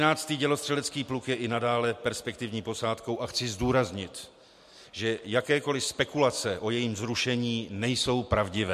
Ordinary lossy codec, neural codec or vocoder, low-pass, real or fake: MP3, 64 kbps; none; 14.4 kHz; real